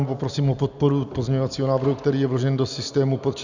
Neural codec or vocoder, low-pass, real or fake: none; 7.2 kHz; real